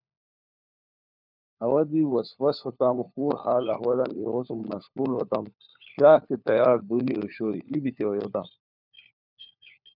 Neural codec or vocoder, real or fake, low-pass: codec, 16 kHz, 4 kbps, FunCodec, trained on LibriTTS, 50 frames a second; fake; 5.4 kHz